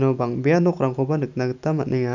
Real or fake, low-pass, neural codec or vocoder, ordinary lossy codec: real; 7.2 kHz; none; none